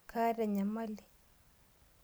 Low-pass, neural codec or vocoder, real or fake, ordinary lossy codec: none; none; real; none